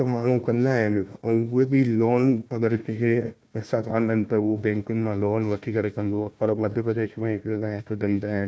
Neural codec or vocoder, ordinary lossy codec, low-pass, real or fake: codec, 16 kHz, 1 kbps, FunCodec, trained on Chinese and English, 50 frames a second; none; none; fake